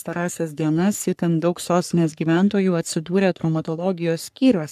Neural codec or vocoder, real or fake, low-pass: codec, 44.1 kHz, 3.4 kbps, Pupu-Codec; fake; 14.4 kHz